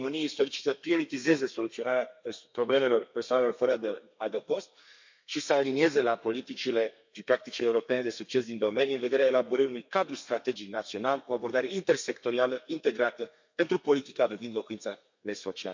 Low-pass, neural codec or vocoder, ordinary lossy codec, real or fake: 7.2 kHz; codec, 32 kHz, 1.9 kbps, SNAC; MP3, 48 kbps; fake